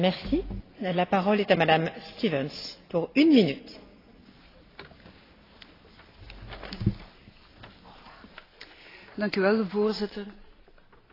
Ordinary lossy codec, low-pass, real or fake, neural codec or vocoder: AAC, 24 kbps; 5.4 kHz; real; none